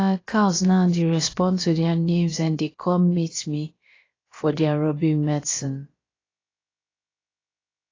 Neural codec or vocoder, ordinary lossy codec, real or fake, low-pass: codec, 16 kHz, about 1 kbps, DyCAST, with the encoder's durations; AAC, 32 kbps; fake; 7.2 kHz